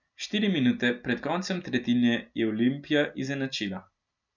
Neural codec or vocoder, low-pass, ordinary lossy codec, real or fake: none; 7.2 kHz; none; real